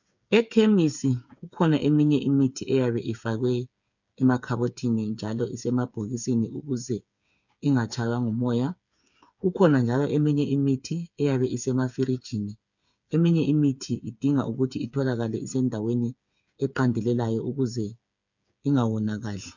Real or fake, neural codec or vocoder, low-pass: fake; codec, 16 kHz, 8 kbps, FreqCodec, smaller model; 7.2 kHz